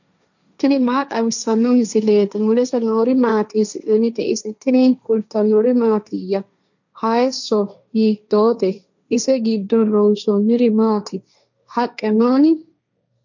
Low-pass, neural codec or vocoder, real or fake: 7.2 kHz; codec, 16 kHz, 1.1 kbps, Voila-Tokenizer; fake